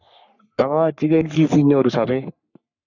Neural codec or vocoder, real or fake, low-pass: codec, 44.1 kHz, 3.4 kbps, Pupu-Codec; fake; 7.2 kHz